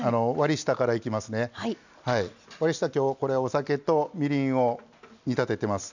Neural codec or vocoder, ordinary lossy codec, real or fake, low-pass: none; none; real; 7.2 kHz